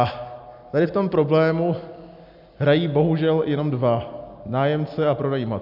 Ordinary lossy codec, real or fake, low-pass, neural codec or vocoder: AAC, 48 kbps; real; 5.4 kHz; none